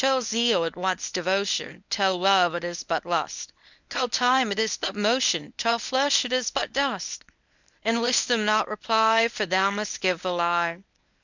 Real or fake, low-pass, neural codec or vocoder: fake; 7.2 kHz; codec, 24 kHz, 0.9 kbps, WavTokenizer, medium speech release version 1